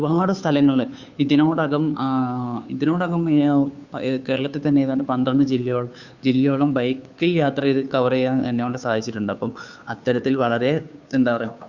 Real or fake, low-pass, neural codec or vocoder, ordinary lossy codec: fake; 7.2 kHz; codec, 16 kHz, 2 kbps, FunCodec, trained on Chinese and English, 25 frames a second; Opus, 64 kbps